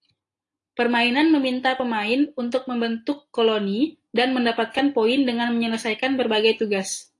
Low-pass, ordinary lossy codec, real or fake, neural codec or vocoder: 10.8 kHz; AAC, 48 kbps; real; none